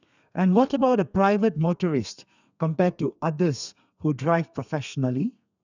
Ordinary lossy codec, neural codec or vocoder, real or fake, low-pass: none; codec, 32 kHz, 1.9 kbps, SNAC; fake; 7.2 kHz